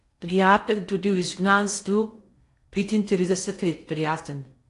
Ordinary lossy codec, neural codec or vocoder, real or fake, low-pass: AAC, 48 kbps; codec, 16 kHz in and 24 kHz out, 0.6 kbps, FocalCodec, streaming, 2048 codes; fake; 10.8 kHz